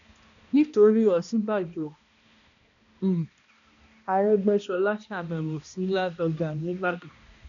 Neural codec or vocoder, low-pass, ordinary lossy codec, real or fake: codec, 16 kHz, 1 kbps, X-Codec, HuBERT features, trained on balanced general audio; 7.2 kHz; none; fake